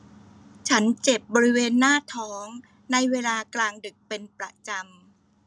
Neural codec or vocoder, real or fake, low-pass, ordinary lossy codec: none; real; none; none